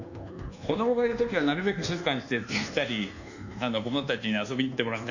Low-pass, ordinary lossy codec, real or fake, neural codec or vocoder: 7.2 kHz; none; fake; codec, 24 kHz, 1.2 kbps, DualCodec